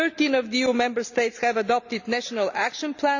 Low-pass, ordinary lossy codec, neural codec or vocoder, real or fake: 7.2 kHz; none; none; real